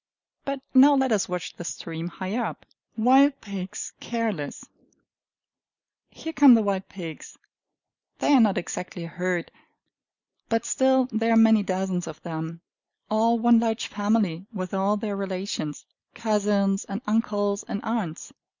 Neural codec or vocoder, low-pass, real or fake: none; 7.2 kHz; real